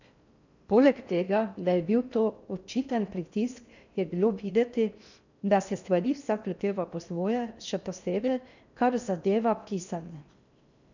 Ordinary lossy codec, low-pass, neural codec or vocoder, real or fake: none; 7.2 kHz; codec, 16 kHz in and 24 kHz out, 0.6 kbps, FocalCodec, streaming, 4096 codes; fake